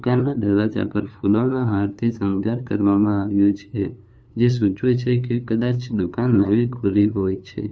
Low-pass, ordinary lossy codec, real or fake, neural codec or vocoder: none; none; fake; codec, 16 kHz, 2 kbps, FunCodec, trained on LibriTTS, 25 frames a second